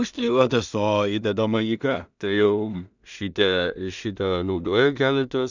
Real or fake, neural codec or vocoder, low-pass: fake; codec, 16 kHz in and 24 kHz out, 0.4 kbps, LongCat-Audio-Codec, two codebook decoder; 7.2 kHz